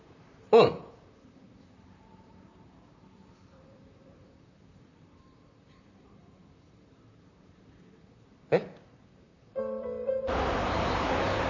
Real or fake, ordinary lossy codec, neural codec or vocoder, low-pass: fake; none; vocoder, 44.1 kHz, 128 mel bands, Pupu-Vocoder; 7.2 kHz